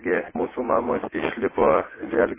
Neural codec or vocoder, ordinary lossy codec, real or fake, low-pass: vocoder, 44.1 kHz, 80 mel bands, Vocos; MP3, 16 kbps; fake; 3.6 kHz